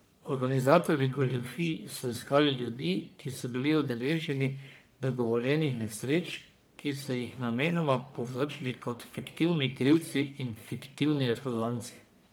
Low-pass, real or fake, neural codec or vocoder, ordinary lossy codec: none; fake; codec, 44.1 kHz, 1.7 kbps, Pupu-Codec; none